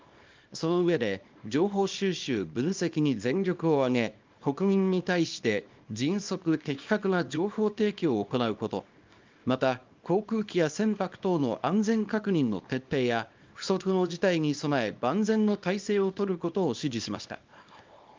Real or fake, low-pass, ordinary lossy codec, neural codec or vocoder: fake; 7.2 kHz; Opus, 24 kbps; codec, 24 kHz, 0.9 kbps, WavTokenizer, small release